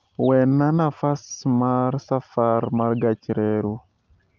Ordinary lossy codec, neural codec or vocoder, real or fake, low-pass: Opus, 24 kbps; none; real; 7.2 kHz